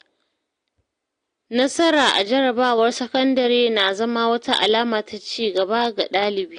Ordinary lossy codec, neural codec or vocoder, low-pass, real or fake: AAC, 48 kbps; none; 9.9 kHz; real